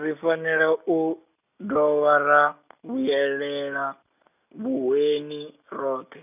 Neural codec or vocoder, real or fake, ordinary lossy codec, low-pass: none; real; none; 3.6 kHz